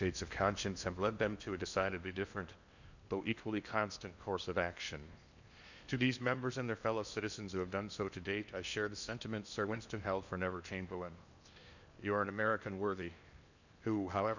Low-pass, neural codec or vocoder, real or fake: 7.2 kHz; codec, 16 kHz in and 24 kHz out, 0.8 kbps, FocalCodec, streaming, 65536 codes; fake